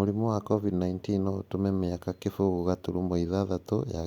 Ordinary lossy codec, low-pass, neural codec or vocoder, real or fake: Opus, 64 kbps; 19.8 kHz; none; real